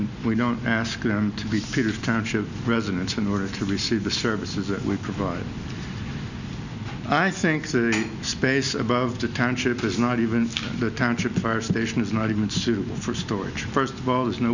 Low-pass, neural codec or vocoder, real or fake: 7.2 kHz; none; real